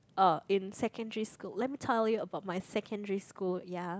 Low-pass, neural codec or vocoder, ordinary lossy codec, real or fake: none; none; none; real